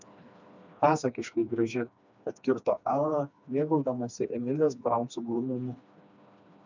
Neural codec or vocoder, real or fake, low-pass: codec, 16 kHz, 2 kbps, FreqCodec, smaller model; fake; 7.2 kHz